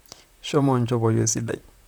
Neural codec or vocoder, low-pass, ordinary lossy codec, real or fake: vocoder, 44.1 kHz, 128 mel bands, Pupu-Vocoder; none; none; fake